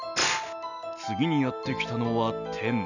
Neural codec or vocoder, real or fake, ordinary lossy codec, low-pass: none; real; none; 7.2 kHz